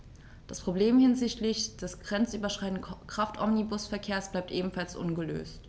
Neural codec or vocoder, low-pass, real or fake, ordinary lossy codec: none; none; real; none